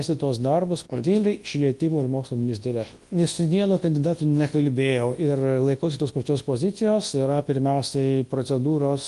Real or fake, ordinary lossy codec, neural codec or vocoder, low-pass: fake; Opus, 24 kbps; codec, 24 kHz, 0.9 kbps, WavTokenizer, large speech release; 10.8 kHz